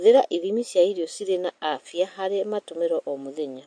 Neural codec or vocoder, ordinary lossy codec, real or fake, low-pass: none; MP3, 48 kbps; real; 9.9 kHz